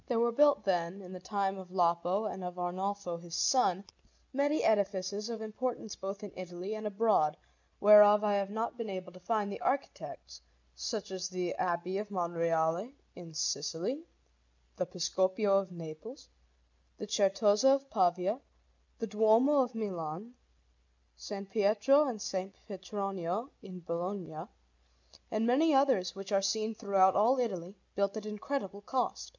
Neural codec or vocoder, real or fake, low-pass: none; real; 7.2 kHz